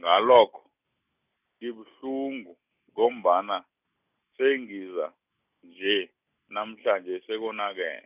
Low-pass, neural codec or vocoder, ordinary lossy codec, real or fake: 3.6 kHz; none; none; real